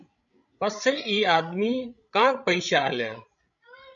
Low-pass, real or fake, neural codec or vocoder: 7.2 kHz; fake; codec, 16 kHz, 16 kbps, FreqCodec, larger model